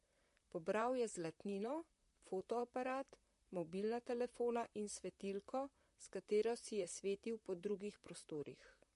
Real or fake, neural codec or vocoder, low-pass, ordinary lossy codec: fake; vocoder, 44.1 kHz, 128 mel bands, Pupu-Vocoder; 14.4 kHz; MP3, 48 kbps